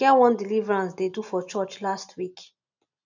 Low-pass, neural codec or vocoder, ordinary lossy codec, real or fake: 7.2 kHz; none; none; real